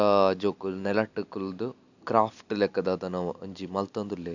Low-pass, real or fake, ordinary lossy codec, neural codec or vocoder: 7.2 kHz; real; none; none